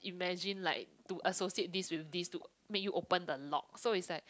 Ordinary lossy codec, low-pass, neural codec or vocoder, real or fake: none; none; none; real